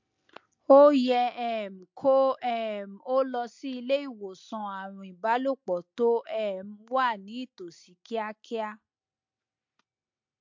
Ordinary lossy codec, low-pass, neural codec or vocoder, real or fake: MP3, 48 kbps; 7.2 kHz; none; real